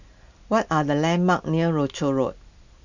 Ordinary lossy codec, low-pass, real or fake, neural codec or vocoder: none; 7.2 kHz; real; none